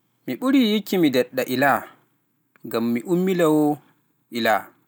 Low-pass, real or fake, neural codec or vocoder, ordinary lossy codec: none; real; none; none